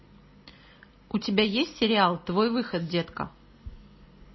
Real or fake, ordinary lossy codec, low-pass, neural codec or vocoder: real; MP3, 24 kbps; 7.2 kHz; none